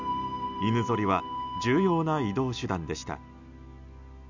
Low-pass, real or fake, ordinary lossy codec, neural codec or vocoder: 7.2 kHz; real; none; none